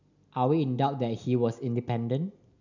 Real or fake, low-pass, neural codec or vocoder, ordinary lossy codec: real; 7.2 kHz; none; none